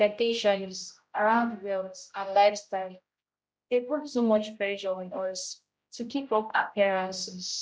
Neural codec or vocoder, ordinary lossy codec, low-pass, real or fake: codec, 16 kHz, 0.5 kbps, X-Codec, HuBERT features, trained on general audio; none; none; fake